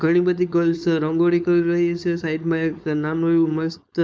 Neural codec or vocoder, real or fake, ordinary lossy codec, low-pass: codec, 16 kHz, 4.8 kbps, FACodec; fake; none; none